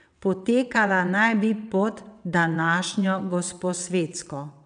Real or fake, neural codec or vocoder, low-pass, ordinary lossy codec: fake; vocoder, 22.05 kHz, 80 mel bands, WaveNeXt; 9.9 kHz; none